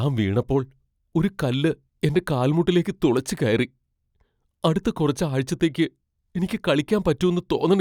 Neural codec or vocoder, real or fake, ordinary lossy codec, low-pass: none; real; none; 19.8 kHz